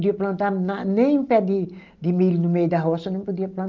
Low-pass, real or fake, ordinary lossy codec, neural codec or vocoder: 7.2 kHz; real; Opus, 24 kbps; none